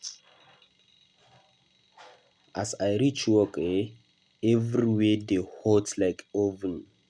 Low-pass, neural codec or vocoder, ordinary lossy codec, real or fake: 9.9 kHz; none; none; real